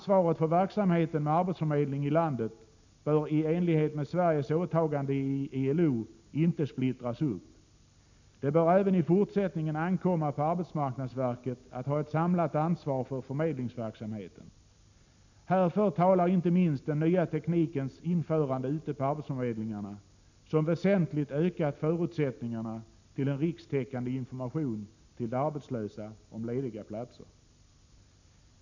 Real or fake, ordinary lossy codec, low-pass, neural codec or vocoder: real; none; 7.2 kHz; none